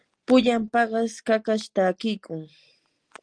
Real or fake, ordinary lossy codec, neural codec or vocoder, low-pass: real; Opus, 24 kbps; none; 9.9 kHz